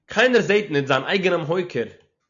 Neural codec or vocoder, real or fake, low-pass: none; real; 7.2 kHz